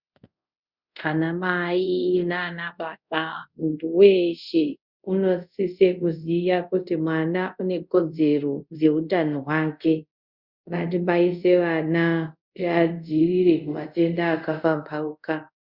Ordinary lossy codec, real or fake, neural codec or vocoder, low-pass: Opus, 64 kbps; fake; codec, 24 kHz, 0.5 kbps, DualCodec; 5.4 kHz